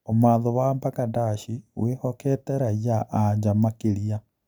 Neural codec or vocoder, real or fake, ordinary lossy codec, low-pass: none; real; none; none